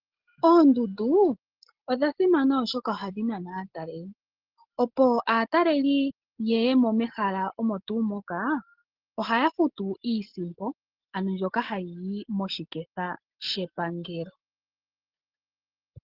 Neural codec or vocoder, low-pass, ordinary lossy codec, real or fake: none; 5.4 kHz; Opus, 16 kbps; real